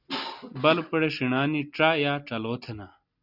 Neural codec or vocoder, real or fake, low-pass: none; real; 5.4 kHz